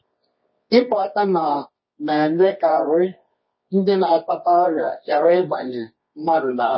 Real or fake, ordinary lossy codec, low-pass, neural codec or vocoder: fake; MP3, 24 kbps; 7.2 kHz; codec, 24 kHz, 0.9 kbps, WavTokenizer, medium music audio release